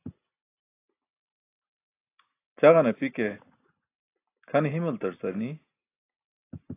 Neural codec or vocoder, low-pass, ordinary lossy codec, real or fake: none; 3.6 kHz; AAC, 24 kbps; real